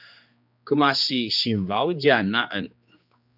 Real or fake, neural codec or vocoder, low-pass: fake; codec, 16 kHz, 2 kbps, X-Codec, HuBERT features, trained on general audio; 5.4 kHz